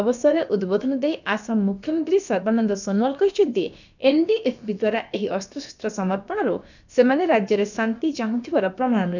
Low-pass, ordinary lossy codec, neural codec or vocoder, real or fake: 7.2 kHz; none; codec, 16 kHz, about 1 kbps, DyCAST, with the encoder's durations; fake